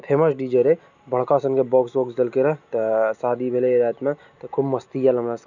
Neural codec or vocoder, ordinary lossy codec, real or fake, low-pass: none; none; real; 7.2 kHz